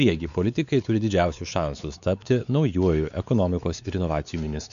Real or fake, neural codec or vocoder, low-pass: fake; codec, 16 kHz, 4 kbps, X-Codec, WavLM features, trained on Multilingual LibriSpeech; 7.2 kHz